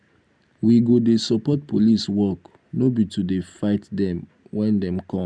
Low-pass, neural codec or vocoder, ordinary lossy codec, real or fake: 9.9 kHz; none; MP3, 96 kbps; real